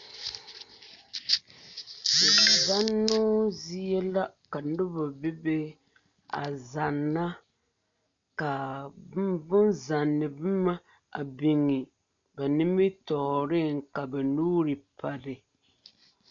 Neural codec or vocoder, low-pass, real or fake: none; 7.2 kHz; real